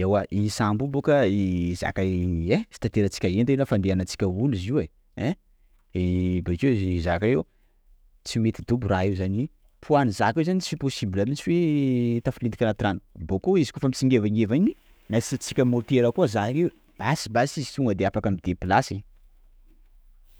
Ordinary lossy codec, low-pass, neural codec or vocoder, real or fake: none; none; autoencoder, 48 kHz, 128 numbers a frame, DAC-VAE, trained on Japanese speech; fake